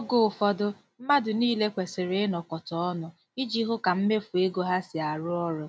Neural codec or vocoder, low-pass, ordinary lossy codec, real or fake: none; none; none; real